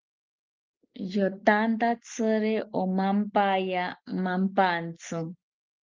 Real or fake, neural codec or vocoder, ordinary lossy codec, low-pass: real; none; Opus, 24 kbps; 7.2 kHz